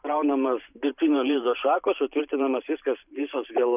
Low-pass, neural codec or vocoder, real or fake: 3.6 kHz; vocoder, 44.1 kHz, 128 mel bands every 512 samples, BigVGAN v2; fake